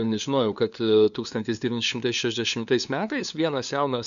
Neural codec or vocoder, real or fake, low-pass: codec, 16 kHz, 2 kbps, FunCodec, trained on LibriTTS, 25 frames a second; fake; 7.2 kHz